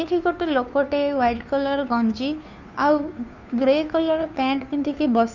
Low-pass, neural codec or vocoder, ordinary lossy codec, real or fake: 7.2 kHz; codec, 16 kHz, 2 kbps, FunCodec, trained on Chinese and English, 25 frames a second; none; fake